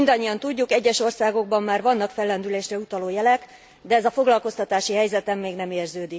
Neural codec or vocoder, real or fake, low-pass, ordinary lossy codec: none; real; none; none